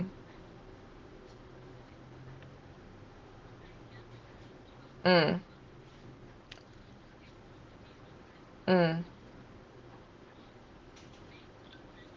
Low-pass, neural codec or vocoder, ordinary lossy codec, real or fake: 7.2 kHz; none; Opus, 16 kbps; real